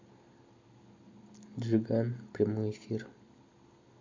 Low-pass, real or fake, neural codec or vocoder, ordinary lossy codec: 7.2 kHz; real; none; MP3, 48 kbps